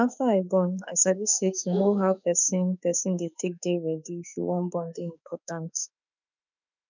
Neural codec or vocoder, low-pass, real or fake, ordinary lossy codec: autoencoder, 48 kHz, 32 numbers a frame, DAC-VAE, trained on Japanese speech; 7.2 kHz; fake; none